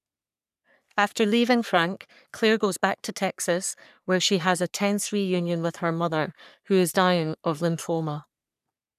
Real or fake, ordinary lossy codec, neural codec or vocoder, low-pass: fake; none; codec, 44.1 kHz, 3.4 kbps, Pupu-Codec; 14.4 kHz